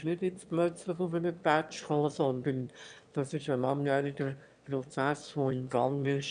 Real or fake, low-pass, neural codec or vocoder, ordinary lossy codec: fake; 9.9 kHz; autoencoder, 22.05 kHz, a latent of 192 numbers a frame, VITS, trained on one speaker; none